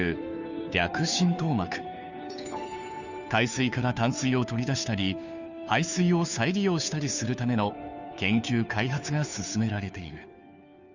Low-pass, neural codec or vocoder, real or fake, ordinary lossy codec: 7.2 kHz; codec, 16 kHz, 2 kbps, FunCodec, trained on Chinese and English, 25 frames a second; fake; none